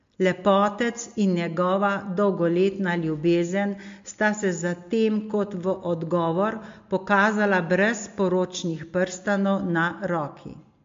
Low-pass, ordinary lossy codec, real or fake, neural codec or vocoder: 7.2 kHz; MP3, 48 kbps; real; none